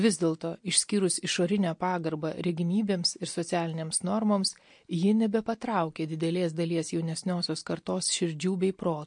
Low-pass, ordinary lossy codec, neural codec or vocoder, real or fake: 9.9 kHz; MP3, 48 kbps; none; real